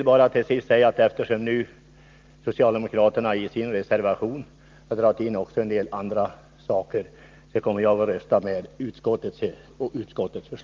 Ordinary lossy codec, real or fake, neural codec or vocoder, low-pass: Opus, 32 kbps; real; none; 7.2 kHz